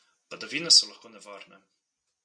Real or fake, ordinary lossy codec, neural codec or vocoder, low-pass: real; MP3, 96 kbps; none; 9.9 kHz